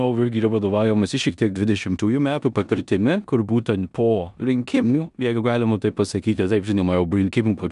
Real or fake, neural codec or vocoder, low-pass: fake; codec, 16 kHz in and 24 kHz out, 0.9 kbps, LongCat-Audio-Codec, four codebook decoder; 10.8 kHz